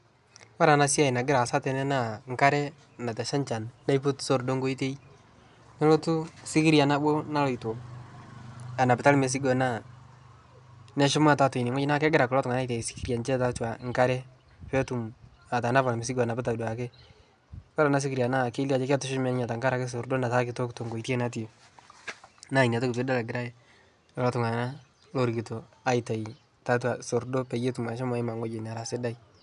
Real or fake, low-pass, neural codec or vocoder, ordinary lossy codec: real; 10.8 kHz; none; none